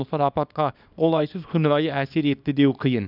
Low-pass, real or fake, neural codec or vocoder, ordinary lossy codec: 5.4 kHz; fake; codec, 24 kHz, 0.9 kbps, WavTokenizer, small release; none